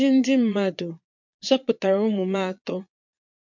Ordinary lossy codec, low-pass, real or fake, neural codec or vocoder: MP3, 48 kbps; 7.2 kHz; fake; vocoder, 22.05 kHz, 80 mel bands, Vocos